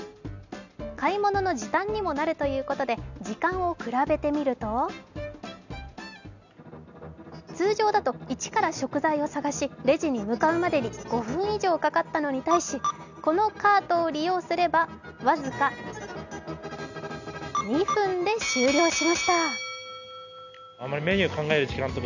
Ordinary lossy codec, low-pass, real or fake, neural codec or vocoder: none; 7.2 kHz; real; none